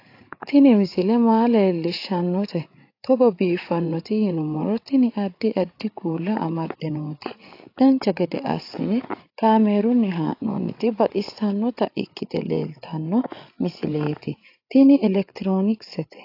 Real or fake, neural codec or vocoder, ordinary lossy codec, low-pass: fake; codec, 16 kHz, 8 kbps, FreqCodec, larger model; AAC, 32 kbps; 5.4 kHz